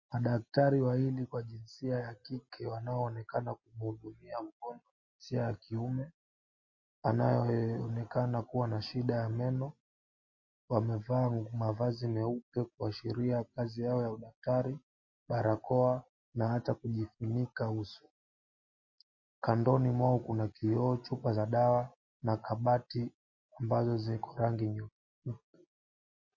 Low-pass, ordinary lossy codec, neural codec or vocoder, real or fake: 7.2 kHz; MP3, 32 kbps; none; real